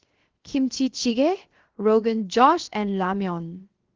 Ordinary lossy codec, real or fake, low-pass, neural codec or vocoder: Opus, 16 kbps; fake; 7.2 kHz; codec, 16 kHz, 0.7 kbps, FocalCodec